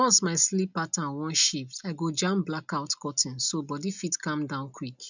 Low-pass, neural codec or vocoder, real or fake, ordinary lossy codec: 7.2 kHz; none; real; none